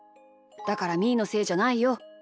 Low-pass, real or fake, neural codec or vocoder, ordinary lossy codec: none; real; none; none